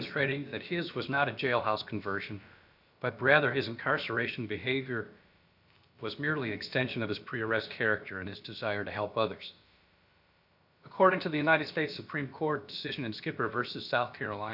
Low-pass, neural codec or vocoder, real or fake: 5.4 kHz; codec, 16 kHz, about 1 kbps, DyCAST, with the encoder's durations; fake